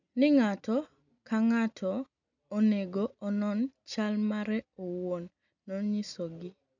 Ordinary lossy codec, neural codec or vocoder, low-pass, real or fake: none; none; 7.2 kHz; real